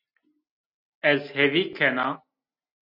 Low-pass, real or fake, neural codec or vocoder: 5.4 kHz; real; none